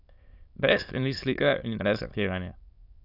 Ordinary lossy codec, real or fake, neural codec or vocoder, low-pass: none; fake; autoencoder, 22.05 kHz, a latent of 192 numbers a frame, VITS, trained on many speakers; 5.4 kHz